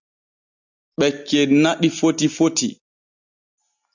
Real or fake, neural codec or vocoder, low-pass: real; none; 7.2 kHz